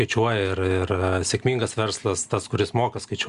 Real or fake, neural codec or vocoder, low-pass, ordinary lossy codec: real; none; 10.8 kHz; Opus, 64 kbps